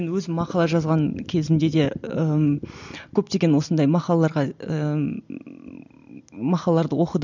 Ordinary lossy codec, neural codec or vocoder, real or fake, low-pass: none; none; real; 7.2 kHz